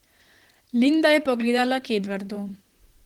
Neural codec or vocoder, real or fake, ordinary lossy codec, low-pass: vocoder, 44.1 kHz, 128 mel bands, Pupu-Vocoder; fake; Opus, 16 kbps; 19.8 kHz